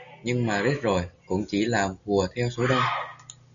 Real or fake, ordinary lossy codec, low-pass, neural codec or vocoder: real; MP3, 96 kbps; 7.2 kHz; none